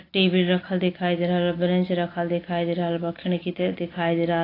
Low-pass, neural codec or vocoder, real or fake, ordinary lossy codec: 5.4 kHz; none; real; AAC, 24 kbps